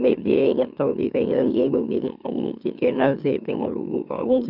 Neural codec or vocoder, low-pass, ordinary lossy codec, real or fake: autoencoder, 44.1 kHz, a latent of 192 numbers a frame, MeloTTS; 5.4 kHz; none; fake